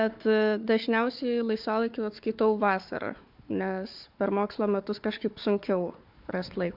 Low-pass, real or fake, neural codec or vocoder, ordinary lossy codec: 5.4 kHz; fake; codec, 16 kHz, 4 kbps, FunCodec, trained on Chinese and English, 50 frames a second; MP3, 48 kbps